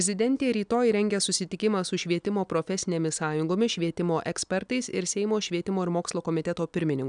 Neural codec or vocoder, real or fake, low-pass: none; real; 9.9 kHz